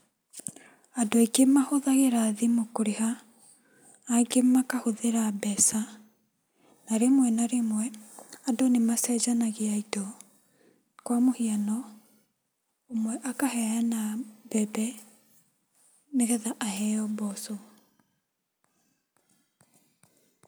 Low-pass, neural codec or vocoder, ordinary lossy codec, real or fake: none; none; none; real